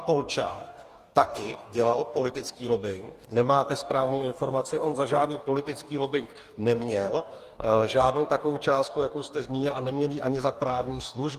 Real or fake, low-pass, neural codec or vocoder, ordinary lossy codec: fake; 14.4 kHz; codec, 44.1 kHz, 2.6 kbps, DAC; Opus, 32 kbps